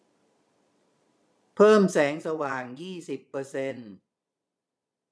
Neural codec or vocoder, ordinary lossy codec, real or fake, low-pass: vocoder, 22.05 kHz, 80 mel bands, Vocos; none; fake; none